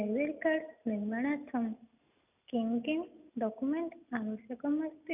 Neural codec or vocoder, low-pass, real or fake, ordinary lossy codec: none; 3.6 kHz; real; none